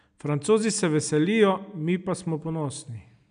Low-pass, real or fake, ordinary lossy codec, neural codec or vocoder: 9.9 kHz; real; none; none